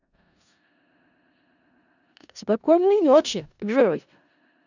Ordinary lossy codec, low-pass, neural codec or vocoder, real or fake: none; 7.2 kHz; codec, 16 kHz in and 24 kHz out, 0.4 kbps, LongCat-Audio-Codec, four codebook decoder; fake